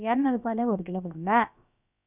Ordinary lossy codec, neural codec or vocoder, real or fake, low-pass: none; codec, 16 kHz, about 1 kbps, DyCAST, with the encoder's durations; fake; 3.6 kHz